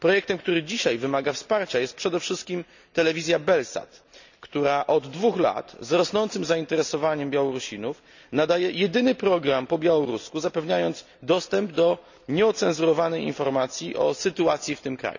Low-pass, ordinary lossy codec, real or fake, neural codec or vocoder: 7.2 kHz; none; real; none